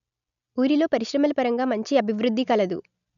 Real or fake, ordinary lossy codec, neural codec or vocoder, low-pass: real; none; none; 7.2 kHz